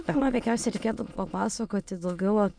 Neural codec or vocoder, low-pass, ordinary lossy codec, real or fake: autoencoder, 22.05 kHz, a latent of 192 numbers a frame, VITS, trained on many speakers; 9.9 kHz; MP3, 96 kbps; fake